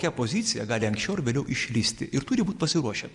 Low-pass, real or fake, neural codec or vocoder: 10.8 kHz; real; none